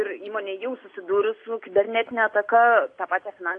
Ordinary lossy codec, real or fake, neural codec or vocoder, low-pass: AAC, 48 kbps; real; none; 9.9 kHz